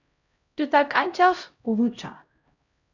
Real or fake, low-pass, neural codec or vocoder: fake; 7.2 kHz; codec, 16 kHz, 0.5 kbps, X-Codec, HuBERT features, trained on LibriSpeech